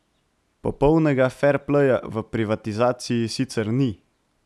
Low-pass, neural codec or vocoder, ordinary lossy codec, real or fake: none; none; none; real